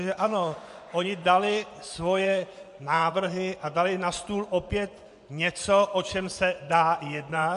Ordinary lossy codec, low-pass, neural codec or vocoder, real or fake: MP3, 64 kbps; 10.8 kHz; vocoder, 24 kHz, 100 mel bands, Vocos; fake